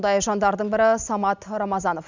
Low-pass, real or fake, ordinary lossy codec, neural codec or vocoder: 7.2 kHz; real; none; none